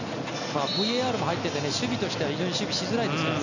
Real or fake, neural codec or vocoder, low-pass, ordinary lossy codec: real; none; 7.2 kHz; none